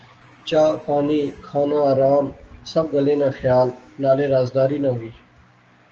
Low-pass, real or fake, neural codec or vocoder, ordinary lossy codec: 7.2 kHz; fake; codec, 16 kHz, 6 kbps, DAC; Opus, 24 kbps